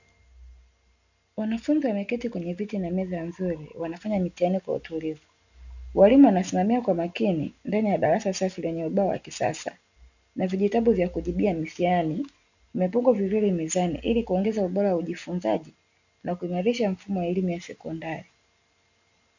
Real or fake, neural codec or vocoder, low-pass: real; none; 7.2 kHz